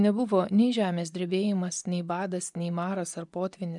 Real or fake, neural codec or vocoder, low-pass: fake; vocoder, 24 kHz, 100 mel bands, Vocos; 10.8 kHz